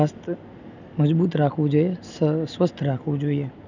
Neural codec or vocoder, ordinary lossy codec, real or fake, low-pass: none; none; real; 7.2 kHz